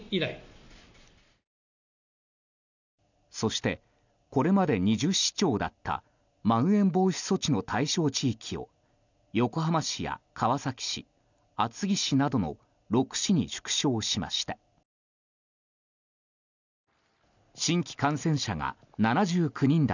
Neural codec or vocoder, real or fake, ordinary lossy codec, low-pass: none; real; none; 7.2 kHz